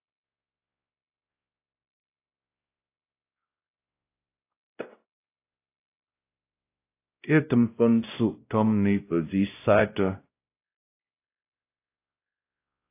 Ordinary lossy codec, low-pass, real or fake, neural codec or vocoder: AAC, 24 kbps; 3.6 kHz; fake; codec, 16 kHz, 0.5 kbps, X-Codec, WavLM features, trained on Multilingual LibriSpeech